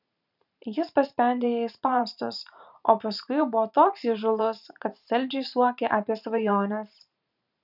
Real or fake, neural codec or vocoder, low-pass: fake; vocoder, 44.1 kHz, 128 mel bands every 512 samples, BigVGAN v2; 5.4 kHz